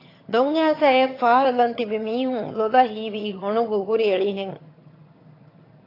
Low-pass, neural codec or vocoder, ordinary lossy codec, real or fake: 5.4 kHz; vocoder, 22.05 kHz, 80 mel bands, HiFi-GAN; AAC, 32 kbps; fake